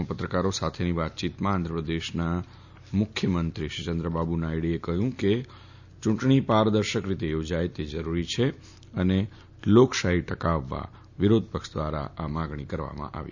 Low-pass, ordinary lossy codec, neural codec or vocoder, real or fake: 7.2 kHz; none; none; real